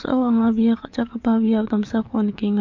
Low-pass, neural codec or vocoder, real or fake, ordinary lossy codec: 7.2 kHz; codec, 44.1 kHz, 7.8 kbps, DAC; fake; MP3, 64 kbps